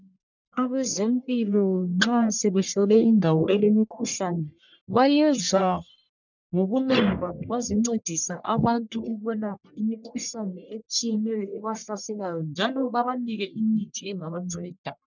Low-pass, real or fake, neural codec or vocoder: 7.2 kHz; fake; codec, 44.1 kHz, 1.7 kbps, Pupu-Codec